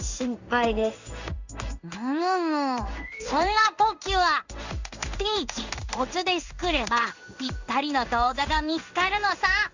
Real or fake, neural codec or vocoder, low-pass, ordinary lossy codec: fake; codec, 16 kHz in and 24 kHz out, 1 kbps, XY-Tokenizer; 7.2 kHz; Opus, 64 kbps